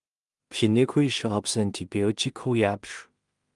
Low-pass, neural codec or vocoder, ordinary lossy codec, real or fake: 10.8 kHz; codec, 16 kHz in and 24 kHz out, 0.4 kbps, LongCat-Audio-Codec, two codebook decoder; Opus, 32 kbps; fake